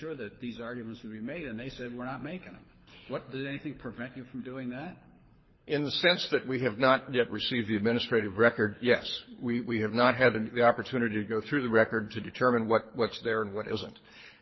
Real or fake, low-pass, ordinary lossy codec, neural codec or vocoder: fake; 7.2 kHz; MP3, 24 kbps; codec, 24 kHz, 6 kbps, HILCodec